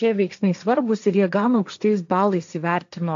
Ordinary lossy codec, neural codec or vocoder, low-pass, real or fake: AAC, 64 kbps; codec, 16 kHz, 1.1 kbps, Voila-Tokenizer; 7.2 kHz; fake